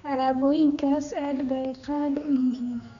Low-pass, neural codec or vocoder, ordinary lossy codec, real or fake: 7.2 kHz; codec, 16 kHz, 2 kbps, X-Codec, HuBERT features, trained on general audio; none; fake